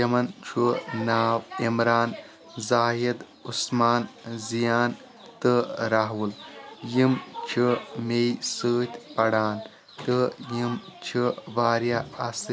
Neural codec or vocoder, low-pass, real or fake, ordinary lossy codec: none; none; real; none